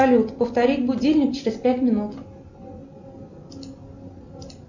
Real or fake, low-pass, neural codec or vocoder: real; 7.2 kHz; none